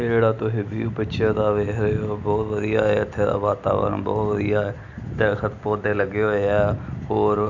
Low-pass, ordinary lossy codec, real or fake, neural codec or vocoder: 7.2 kHz; none; real; none